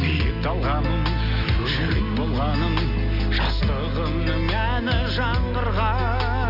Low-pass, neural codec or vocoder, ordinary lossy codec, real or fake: 5.4 kHz; none; none; real